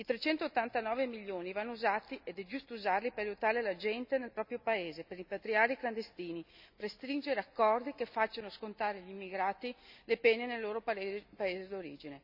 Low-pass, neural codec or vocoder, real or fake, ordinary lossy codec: 5.4 kHz; none; real; none